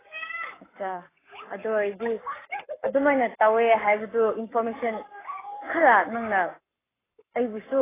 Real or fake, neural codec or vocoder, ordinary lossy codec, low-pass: fake; codec, 44.1 kHz, 7.8 kbps, Pupu-Codec; AAC, 16 kbps; 3.6 kHz